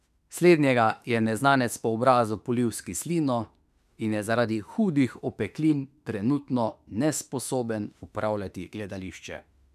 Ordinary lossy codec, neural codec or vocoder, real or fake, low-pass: none; autoencoder, 48 kHz, 32 numbers a frame, DAC-VAE, trained on Japanese speech; fake; 14.4 kHz